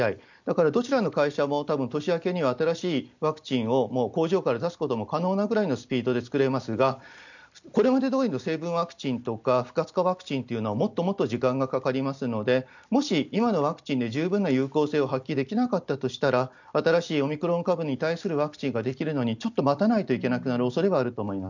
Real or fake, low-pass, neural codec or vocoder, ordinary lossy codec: real; 7.2 kHz; none; none